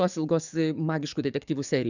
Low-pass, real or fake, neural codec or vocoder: 7.2 kHz; fake; codec, 44.1 kHz, 7.8 kbps, DAC